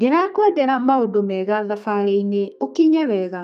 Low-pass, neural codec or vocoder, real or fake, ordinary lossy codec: 14.4 kHz; codec, 32 kHz, 1.9 kbps, SNAC; fake; none